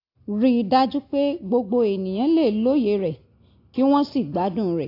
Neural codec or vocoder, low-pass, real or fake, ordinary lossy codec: none; 5.4 kHz; real; AAC, 32 kbps